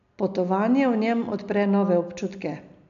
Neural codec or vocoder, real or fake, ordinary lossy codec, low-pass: none; real; none; 7.2 kHz